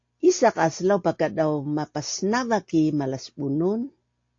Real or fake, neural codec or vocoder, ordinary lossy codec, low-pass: real; none; AAC, 48 kbps; 7.2 kHz